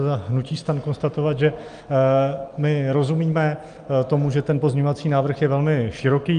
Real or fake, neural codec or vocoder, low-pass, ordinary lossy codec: real; none; 9.9 kHz; Opus, 32 kbps